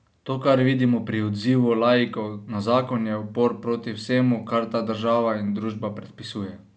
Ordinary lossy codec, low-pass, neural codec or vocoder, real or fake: none; none; none; real